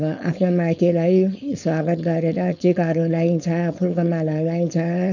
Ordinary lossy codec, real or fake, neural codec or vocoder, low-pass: none; fake; codec, 16 kHz, 4.8 kbps, FACodec; 7.2 kHz